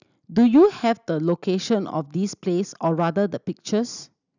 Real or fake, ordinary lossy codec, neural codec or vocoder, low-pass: real; none; none; 7.2 kHz